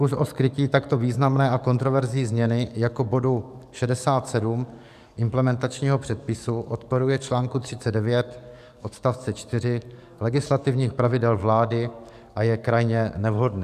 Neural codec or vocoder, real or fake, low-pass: codec, 44.1 kHz, 7.8 kbps, DAC; fake; 14.4 kHz